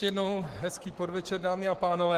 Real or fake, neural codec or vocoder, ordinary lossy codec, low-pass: fake; codec, 44.1 kHz, 7.8 kbps, Pupu-Codec; Opus, 16 kbps; 14.4 kHz